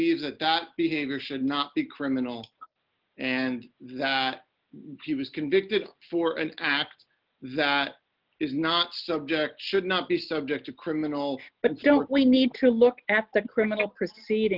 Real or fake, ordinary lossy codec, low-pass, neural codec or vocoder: real; Opus, 32 kbps; 5.4 kHz; none